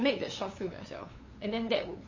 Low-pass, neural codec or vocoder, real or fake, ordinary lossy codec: 7.2 kHz; codec, 16 kHz, 8 kbps, FunCodec, trained on LibriTTS, 25 frames a second; fake; MP3, 32 kbps